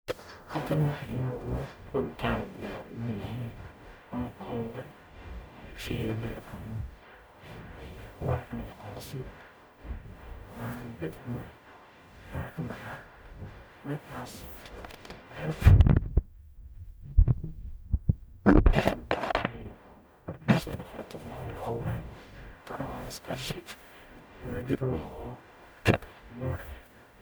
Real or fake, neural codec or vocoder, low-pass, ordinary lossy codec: fake; codec, 44.1 kHz, 0.9 kbps, DAC; none; none